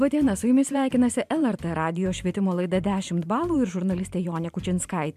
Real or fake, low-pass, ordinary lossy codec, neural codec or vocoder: fake; 14.4 kHz; MP3, 96 kbps; vocoder, 44.1 kHz, 128 mel bands every 512 samples, BigVGAN v2